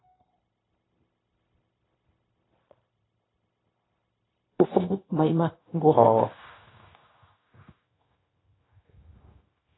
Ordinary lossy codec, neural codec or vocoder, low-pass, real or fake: AAC, 16 kbps; codec, 16 kHz, 0.9 kbps, LongCat-Audio-Codec; 7.2 kHz; fake